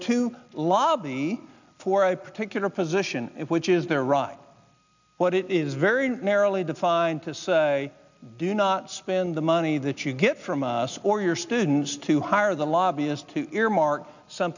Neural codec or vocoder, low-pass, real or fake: none; 7.2 kHz; real